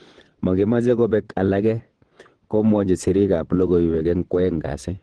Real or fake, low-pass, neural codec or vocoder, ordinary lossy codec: fake; 9.9 kHz; vocoder, 22.05 kHz, 80 mel bands, WaveNeXt; Opus, 16 kbps